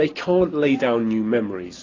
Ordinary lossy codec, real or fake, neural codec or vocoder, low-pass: AAC, 32 kbps; real; none; 7.2 kHz